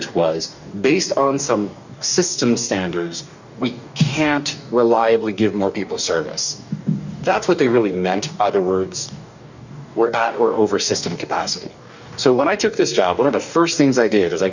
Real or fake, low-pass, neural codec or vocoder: fake; 7.2 kHz; codec, 44.1 kHz, 2.6 kbps, DAC